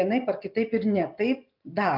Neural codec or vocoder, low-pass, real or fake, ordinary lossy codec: none; 5.4 kHz; real; MP3, 48 kbps